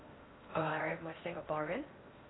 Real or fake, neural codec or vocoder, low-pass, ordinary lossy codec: fake; codec, 16 kHz in and 24 kHz out, 0.6 kbps, FocalCodec, streaming, 4096 codes; 7.2 kHz; AAC, 16 kbps